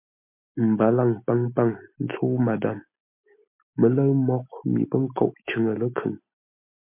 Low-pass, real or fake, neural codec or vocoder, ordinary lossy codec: 3.6 kHz; real; none; MP3, 32 kbps